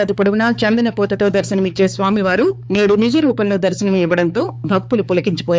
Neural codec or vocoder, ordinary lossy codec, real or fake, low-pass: codec, 16 kHz, 4 kbps, X-Codec, HuBERT features, trained on balanced general audio; none; fake; none